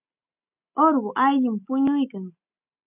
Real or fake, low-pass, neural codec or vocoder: real; 3.6 kHz; none